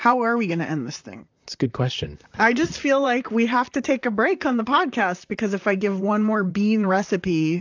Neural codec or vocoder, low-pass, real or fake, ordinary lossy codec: vocoder, 44.1 kHz, 128 mel bands, Pupu-Vocoder; 7.2 kHz; fake; AAC, 48 kbps